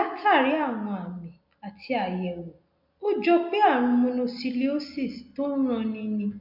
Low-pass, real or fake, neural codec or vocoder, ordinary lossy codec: 5.4 kHz; real; none; MP3, 48 kbps